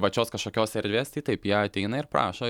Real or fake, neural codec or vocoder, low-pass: real; none; 19.8 kHz